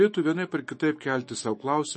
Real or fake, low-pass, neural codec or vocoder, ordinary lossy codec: fake; 10.8 kHz; autoencoder, 48 kHz, 128 numbers a frame, DAC-VAE, trained on Japanese speech; MP3, 32 kbps